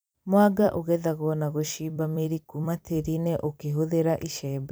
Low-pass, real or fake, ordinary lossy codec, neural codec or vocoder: none; fake; none; vocoder, 44.1 kHz, 128 mel bands every 256 samples, BigVGAN v2